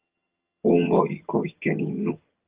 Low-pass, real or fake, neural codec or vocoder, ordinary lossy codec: 3.6 kHz; fake; vocoder, 22.05 kHz, 80 mel bands, HiFi-GAN; Opus, 32 kbps